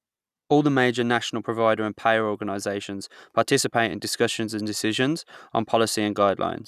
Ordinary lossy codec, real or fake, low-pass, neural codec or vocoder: none; real; 14.4 kHz; none